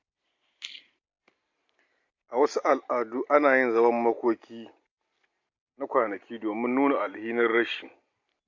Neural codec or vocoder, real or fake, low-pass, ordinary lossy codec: none; real; 7.2 kHz; MP3, 48 kbps